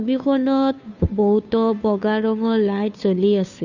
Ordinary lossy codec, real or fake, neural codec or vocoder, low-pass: none; fake; codec, 16 kHz, 2 kbps, FunCodec, trained on Chinese and English, 25 frames a second; 7.2 kHz